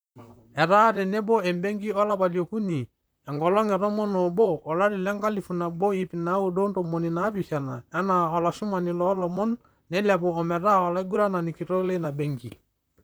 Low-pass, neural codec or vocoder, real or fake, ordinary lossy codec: none; vocoder, 44.1 kHz, 128 mel bands, Pupu-Vocoder; fake; none